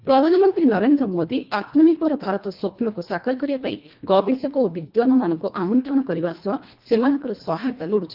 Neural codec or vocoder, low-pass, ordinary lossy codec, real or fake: codec, 24 kHz, 1.5 kbps, HILCodec; 5.4 kHz; Opus, 24 kbps; fake